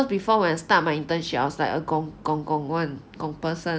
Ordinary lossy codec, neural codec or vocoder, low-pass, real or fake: none; none; none; real